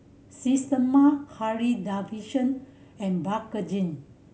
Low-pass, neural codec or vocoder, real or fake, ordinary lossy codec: none; none; real; none